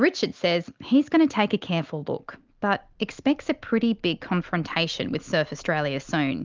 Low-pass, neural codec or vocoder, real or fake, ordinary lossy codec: 7.2 kHz; none; real; Opus, 24 kbps